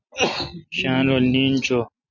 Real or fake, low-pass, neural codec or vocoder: real; 7.2 kHz; none